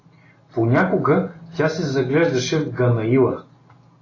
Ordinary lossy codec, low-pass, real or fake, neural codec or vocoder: AAC, 32 kbps; 7.2 kHz; real; none